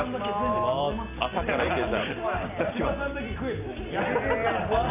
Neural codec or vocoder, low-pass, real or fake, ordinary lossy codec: none; 3.6 kHz; real; none